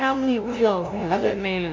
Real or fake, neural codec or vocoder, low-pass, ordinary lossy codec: fake; codec, 16 kHz, 0.5 kbps, FunCodec, trained on LibriTTS, 25 frames a second; 7.2 kHz; MP3, 64 kbps